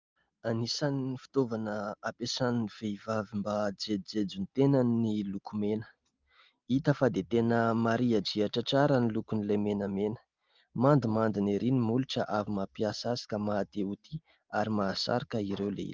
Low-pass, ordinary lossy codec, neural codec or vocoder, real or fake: 7.2 kHz; Opus, 32 kbps; none; real